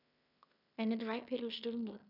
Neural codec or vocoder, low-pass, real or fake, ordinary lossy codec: codec, 16 kHz in and 24 kHz out, 0.9 kbps, LongCat-Audio-Codec, fine tuned four codebook decoder; 5.4 kHz; fake; none